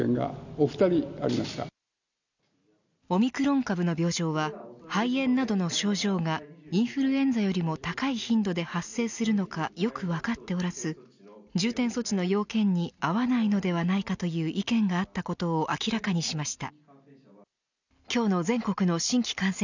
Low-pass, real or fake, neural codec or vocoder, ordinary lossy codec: 7.2 kHz; real; none; none